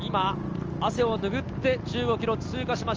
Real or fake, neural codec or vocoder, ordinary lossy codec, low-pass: real; none; Opus, 24 kbps; 7.2 kHz